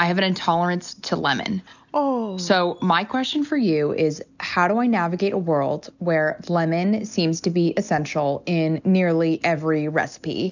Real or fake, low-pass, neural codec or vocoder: real; 7.2 kHz; none